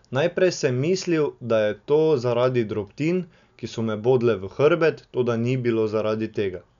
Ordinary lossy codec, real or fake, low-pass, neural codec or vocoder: none; real; 7.2 kHz; none